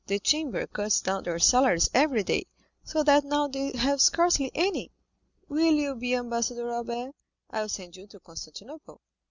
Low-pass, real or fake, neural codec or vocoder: 7.2 kHz; real; none